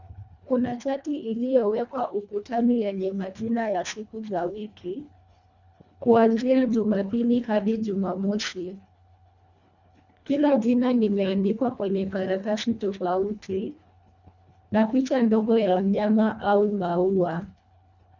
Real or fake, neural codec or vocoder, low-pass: fake; codec, 24 kHz, 1.5 kbps, HILCodec; 7.2 kHz